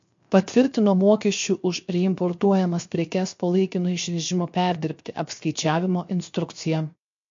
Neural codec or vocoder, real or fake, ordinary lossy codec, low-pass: codec, 16 kHz, 0.7 kbps, FocalCodec; fake; MP3, 48 kbps; 7.2 kHz